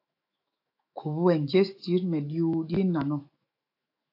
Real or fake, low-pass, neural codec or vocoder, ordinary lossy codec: fake; 5.4 kHz; autoencoder, 48 kHz, 128 numbers a frame, DAC-VAE, trained on Japanese speech; AAC, 32 kbps